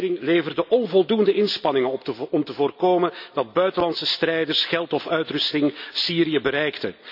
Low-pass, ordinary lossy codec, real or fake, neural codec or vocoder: 5.4 kHz; none; real; none